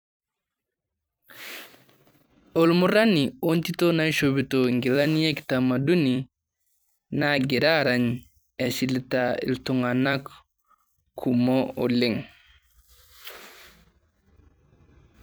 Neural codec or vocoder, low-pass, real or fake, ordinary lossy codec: vocoder, 44.1 kHz, 128 mel bands every 256 samples, BigVGAN v2; none; fake; none